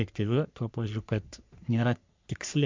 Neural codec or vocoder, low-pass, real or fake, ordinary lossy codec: codec, 44.1 kHz, 3.4 kbps, Pupu-Codec; 7.2 kHz; fake; MP3, 64 kbps